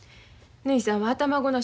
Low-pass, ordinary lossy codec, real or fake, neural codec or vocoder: none; none; real; none